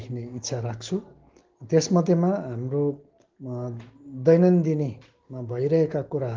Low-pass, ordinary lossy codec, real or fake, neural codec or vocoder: 7.2 kHz; Opus, 16 kbps; real; none